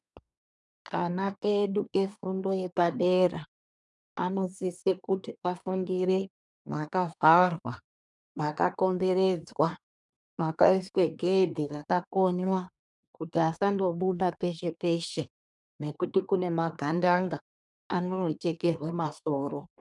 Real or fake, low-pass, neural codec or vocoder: fake; 10.8 kHz; codec, 24 kHz, 1 kbps, SNAC